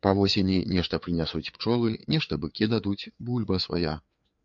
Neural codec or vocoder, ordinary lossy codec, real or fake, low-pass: codec, 16 kHz, 4 kbps, FreqCodec, larger model; MP3, 64 kbps; fake; 7.2 kHz